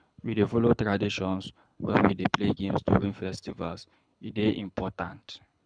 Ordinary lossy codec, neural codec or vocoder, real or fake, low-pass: none; codec, 24 kHz, 6 kbps, HILCodec; fake; 9.9 kHz